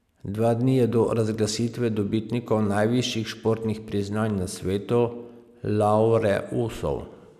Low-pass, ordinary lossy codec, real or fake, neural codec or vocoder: 14.4 kHz; none; real; none